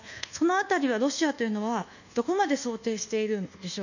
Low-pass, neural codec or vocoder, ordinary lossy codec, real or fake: 7.2 kHz; codec, 24 kHz, 1.2 kbps, DualCodec; none; fake